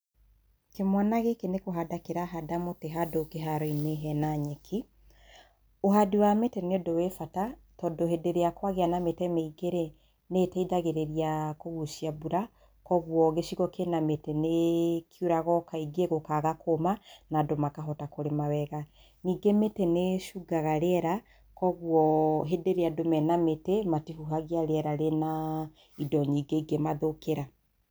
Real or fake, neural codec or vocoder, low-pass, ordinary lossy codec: real; none; none; none